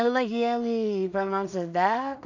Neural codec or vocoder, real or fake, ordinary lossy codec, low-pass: codec, 16 kHz in and 24 kHz out, 0.4 kbps, LongCat-Audio-Codec, two codebook decoder; fake; none; 7.2 kHz